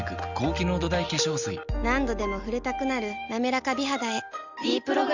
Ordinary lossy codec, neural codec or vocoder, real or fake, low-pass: none; none; real; 7.2 kHz